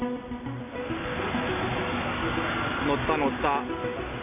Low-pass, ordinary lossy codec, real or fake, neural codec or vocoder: 3.6 kHz; none; real; none